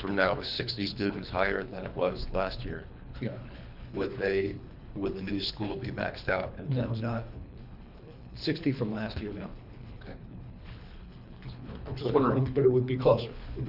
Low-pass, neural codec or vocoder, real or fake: 5.4 kHz; codec, 24 kHz, 3 kbps, HILCodec; fake